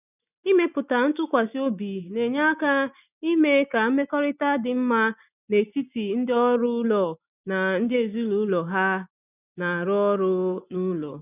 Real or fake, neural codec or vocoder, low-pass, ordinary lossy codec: real; none; 3.6 kHz; none